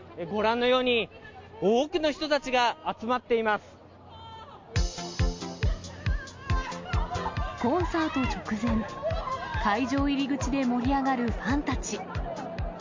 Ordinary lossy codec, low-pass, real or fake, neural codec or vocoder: MP3, 48 kbps; 7.2 kHz; real; none